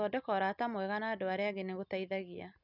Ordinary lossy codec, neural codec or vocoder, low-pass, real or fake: none; none; 5.4 kHz; real